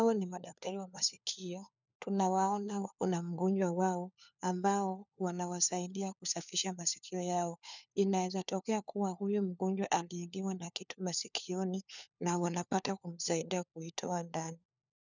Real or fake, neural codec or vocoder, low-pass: fake; codec, 16 kHz, 4 kbps, FunCodec, trained on LibriTTS, 50 frames a second; 7.2 kHz